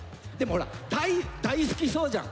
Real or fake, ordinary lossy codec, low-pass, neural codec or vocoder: real; none; none; none